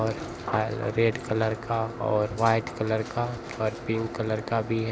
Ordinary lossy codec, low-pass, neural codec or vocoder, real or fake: none; none; none; real